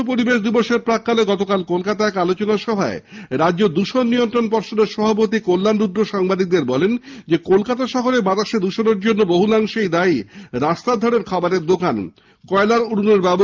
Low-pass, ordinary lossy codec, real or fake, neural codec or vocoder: 7.2 kHz; Opus, 32 kbps; real; none